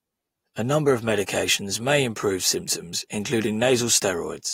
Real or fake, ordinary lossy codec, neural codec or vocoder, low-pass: real; AAC, 48 kbps; none; 19.8 kHz